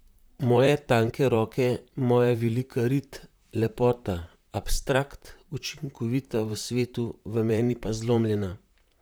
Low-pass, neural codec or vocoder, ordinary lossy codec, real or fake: none; vocoder, 44.1 kHz, 128 mel bands, Pupu-Vocoder; none; fake